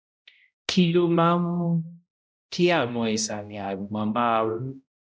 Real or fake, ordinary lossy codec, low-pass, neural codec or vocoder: fake; none; none; codec, 16 kHz, 0.5 kbps, X-Codec, HuBERT features, trained on balanced general audio